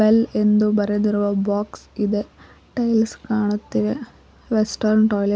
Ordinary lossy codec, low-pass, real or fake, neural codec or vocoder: none; none; real; none